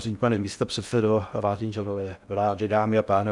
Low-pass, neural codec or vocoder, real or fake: 10.8 kHz; codec, 16 kHz in and 24 kHz out, 0.6 kbps, FocalCodec, streaming, 2048 codes; fake